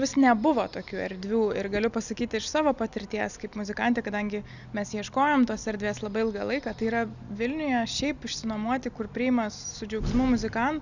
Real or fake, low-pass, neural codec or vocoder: real; 7.2 kHz; none